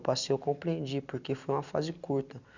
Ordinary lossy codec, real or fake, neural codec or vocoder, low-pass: none; real; none; 7.2 kHz